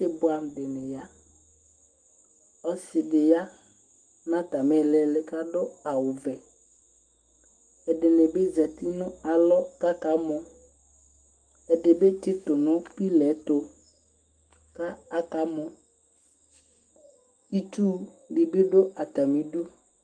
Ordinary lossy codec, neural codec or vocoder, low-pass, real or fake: Opus, 24 kbps; autoencoder, 48 kHz, 128 numbers a frame, DAC-VAE, trained on Japanese speech; 9.9 kHz; fake